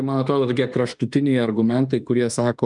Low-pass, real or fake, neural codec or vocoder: 10.8 kHz; fake; autoencoder, 48 kHz, 32 numbers a frame, DAC-VAE, trained on Japanese speech